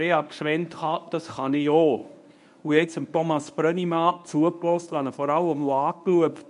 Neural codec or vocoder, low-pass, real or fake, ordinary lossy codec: codec, 24 kHz, 0.9 kbps, WavTokenizer, medium speech release version 1; 10.8 kHz; fake; MP3, 64 kbps